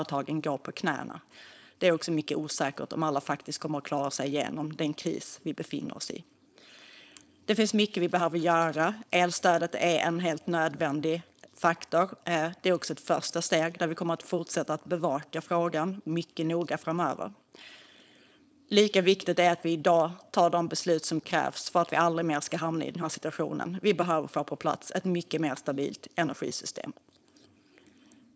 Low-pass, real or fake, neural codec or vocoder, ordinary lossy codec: none; fake; codec, 16 kHz, 4.8 kbps, FACodec; none